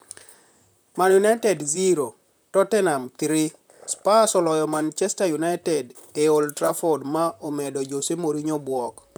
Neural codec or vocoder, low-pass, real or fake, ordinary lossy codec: vocoder, 44.1 kHz, 128 mel bands, Pupu-Vocoder; none; fake; none